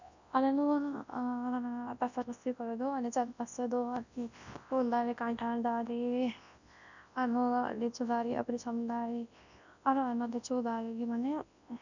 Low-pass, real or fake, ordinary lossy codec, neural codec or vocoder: 7.2 kHz; fake; none; codec, 24 kHz, 0.9 kbps, WavTokenizer, large speech release